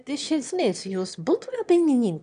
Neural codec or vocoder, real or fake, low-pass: autoencoder, 22.05 kHz, a latent of 192 numbers a frame, VITS, trained on one speaker; fake; 9.9 kHz